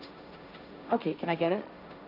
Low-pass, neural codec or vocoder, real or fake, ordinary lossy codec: 5.4 kHz; codec, 16 kHz, 1.1 kbps, Voila-Tokenizer; fake; none